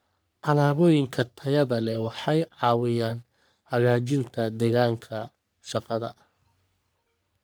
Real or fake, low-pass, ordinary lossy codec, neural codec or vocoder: fake; none; none; codec, 44.1 kHz, 3.4 kbps, Pupu-Codec